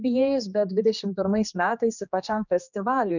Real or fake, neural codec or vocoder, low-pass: fake; codec, 16 kHz, 2 kbps, X-Codec, HuBERT features, trained on general audio; 7.2 kHz